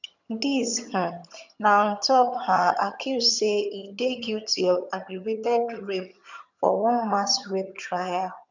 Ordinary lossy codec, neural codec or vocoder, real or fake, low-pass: none; vocoder, 22.05 kHz, 80 mel bands, HiFi-GAN; fake; 7.2 kHz